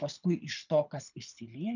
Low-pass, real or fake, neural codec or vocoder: 7.2 kHz; fake; codec, 24 kHz, 6 kbps, HILCodec